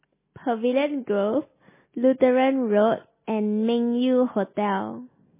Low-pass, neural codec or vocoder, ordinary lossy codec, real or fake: 3.6 kHz; none; MP3, 16 kbps; real